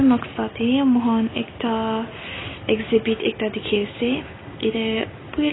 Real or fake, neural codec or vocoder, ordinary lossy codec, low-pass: real; none; AAC, 16 kbps; 7.2 kHz